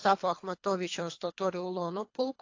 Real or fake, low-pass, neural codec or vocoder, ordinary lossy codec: fake; 7.2 kHz; codec, 24 kHz, 3 kbps, HILCodec; AAC, 48 kbps